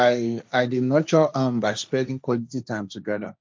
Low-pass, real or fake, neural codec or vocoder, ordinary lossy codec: none; fake; codec, 16 kHz, 1.1 kbps, Voila-Tokenizer; none